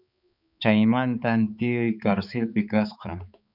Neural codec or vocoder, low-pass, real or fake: codec, 16 kHz, 4 kbps, X-Codec, HuBERT features, trained on balanced general audio; 5.4 kHz; fake